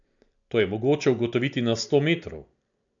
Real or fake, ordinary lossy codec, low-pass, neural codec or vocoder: real; none; 7.2 kHz; none